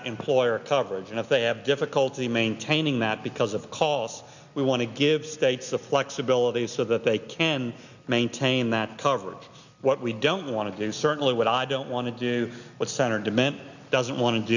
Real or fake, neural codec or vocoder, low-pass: real; none; 7.2 kHz